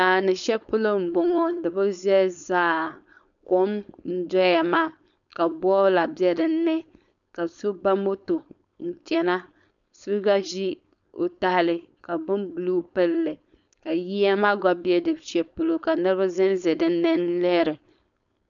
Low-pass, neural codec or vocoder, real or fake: 7.2 kHz; codec, 16 kHz, 4.8 kbps, FACodec; fake